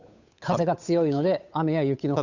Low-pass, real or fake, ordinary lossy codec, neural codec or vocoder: 7.2 kHz; fake; none; codec, 16 kHz, 8 kbps, FunCodec, trained on Chinese and English, 25 frames a second